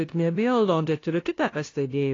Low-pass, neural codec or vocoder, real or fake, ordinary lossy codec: 7.2 kHz; codec, 16 kHz, 0.5 kbps, FunCodec, trained on LibriTTS, 25 frames a second; fake; AAC, 32 kbps